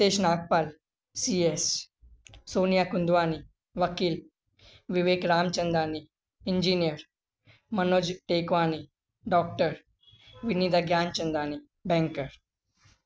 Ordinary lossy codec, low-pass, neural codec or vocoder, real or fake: none; none; none; real